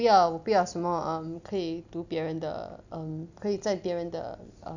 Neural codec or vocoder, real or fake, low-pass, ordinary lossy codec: none; real; 7.2 kHz; none